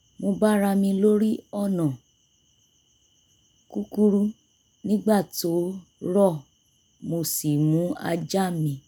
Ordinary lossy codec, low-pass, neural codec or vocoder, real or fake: none; none; none; real